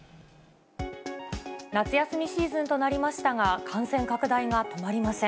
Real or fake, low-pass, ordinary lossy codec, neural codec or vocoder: real; none; none; none